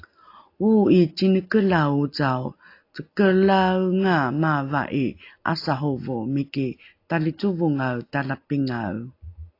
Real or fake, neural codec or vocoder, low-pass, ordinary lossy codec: real; none; 5.4 kHz; AAC, 32 kbps